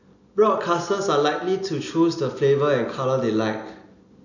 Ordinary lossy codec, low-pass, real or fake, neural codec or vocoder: none; 7.2 kHz; real; none